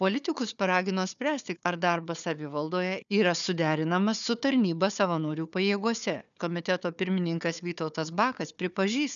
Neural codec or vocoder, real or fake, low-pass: codec, 16 kHz, 4 kbps, FunCodec, trained on Chinese and English, 50 frames a second; fake; 7.2 kHz